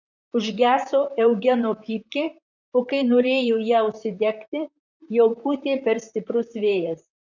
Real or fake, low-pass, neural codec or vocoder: fake; 7.2 kHz; vocoder, 44.1 kHz, 128 mel bands, Pupu-Vocoder